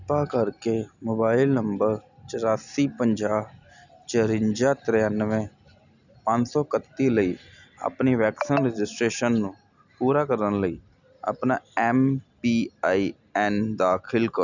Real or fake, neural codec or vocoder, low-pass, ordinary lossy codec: real; none; 7.2 kHz; none